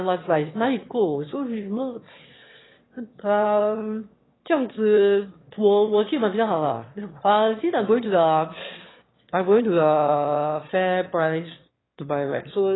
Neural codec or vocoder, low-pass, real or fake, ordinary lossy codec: autoencoder, 22.05 kHz, a latent of 192 numbers a frame, VITS, trained on one speaker; 7.2 kHz; fake; AAC, 16 kbps